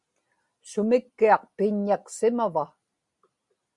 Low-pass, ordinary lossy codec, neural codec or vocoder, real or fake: 10.8 kHz; Opus, 64 kbps; none; real